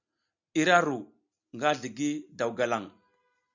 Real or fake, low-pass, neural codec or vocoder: real; 7.2 kHz; none